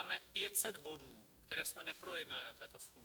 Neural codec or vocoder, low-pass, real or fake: codec, 44.1 kHz, 2.6 kbps, DAC; 19.8 kHz; fake